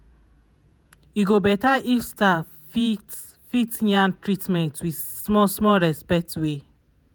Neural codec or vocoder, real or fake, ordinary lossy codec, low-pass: vocoder, 48 kHz, 128 mel bands, Vocos; fake; none; none